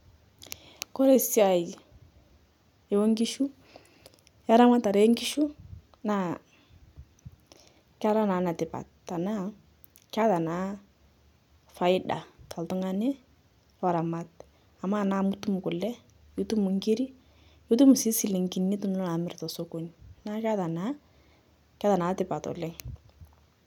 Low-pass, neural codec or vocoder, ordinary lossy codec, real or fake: 19.8 kHz; none; none; real